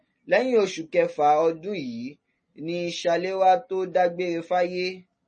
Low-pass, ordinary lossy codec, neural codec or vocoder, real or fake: 10.8 kHz; MP3, 32 kbps; none; real